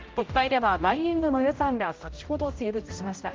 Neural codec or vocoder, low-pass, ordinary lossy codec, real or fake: codec, 16 kHz, 0.5 kbps, X-Codec, HuBERT features, trained on general audio; 7.2 kHz; Opus, 32 kbps; fake